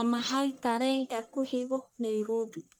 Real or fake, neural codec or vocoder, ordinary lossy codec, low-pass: fake; codec, 44.1 kHz, 1.7 kbps, Pupu-Codec; none; none